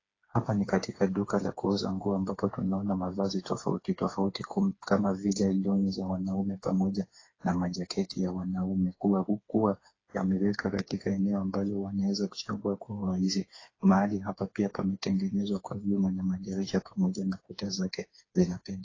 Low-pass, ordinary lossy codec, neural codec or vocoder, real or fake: 7.2 kHz; AAC, 32 kbps; codec, 16 kHz, 4 kbps, FreqCodec, smaller model; fake